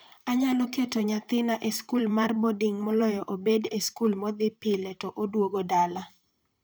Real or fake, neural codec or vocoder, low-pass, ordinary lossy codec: fake; vocoder, 44.1 kHz, 128 mel bands, Pupu-Vocoder; none; none